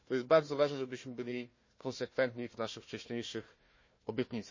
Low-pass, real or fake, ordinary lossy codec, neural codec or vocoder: 7.2 kHz; fake; MP3, 32 kbps; codec, 16 kHz, 1 kbps, FunCodec, trained on Chinese and English, 50 frames a second